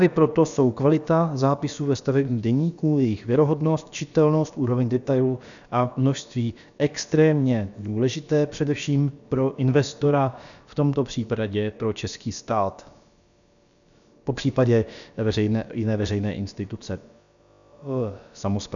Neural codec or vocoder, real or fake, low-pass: codec, 16 kHz, about 1 kbps, DyCAST, with the encoder's durations; fake; 7.2 kHz